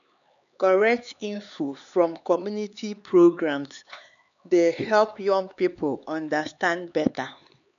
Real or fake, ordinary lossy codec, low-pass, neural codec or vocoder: fake; none; 7.2 kHz; codec, 16 kHz, 4 kbps, X-Codec, HuBERT features, trained on LibriSpeech